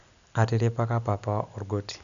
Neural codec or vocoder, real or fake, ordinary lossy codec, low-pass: none; real; none; 7.2 kHz